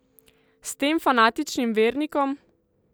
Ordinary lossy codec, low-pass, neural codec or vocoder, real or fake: none; none; none; real